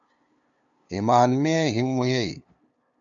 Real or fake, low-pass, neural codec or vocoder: fake; 7.2 kHz; codec, 16 kHz, 2 kbps, FunCodec, trained on LibriTTS, 25 frames a second